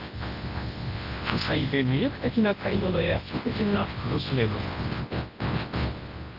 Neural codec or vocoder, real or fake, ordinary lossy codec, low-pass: codec, 24 kHz, 0.9 kbps, WavTokenizer, large speech release; fake; Opus, 32 kbps; 5.4 kHz